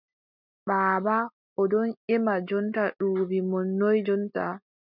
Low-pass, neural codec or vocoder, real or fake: 5.4 kHz; none; real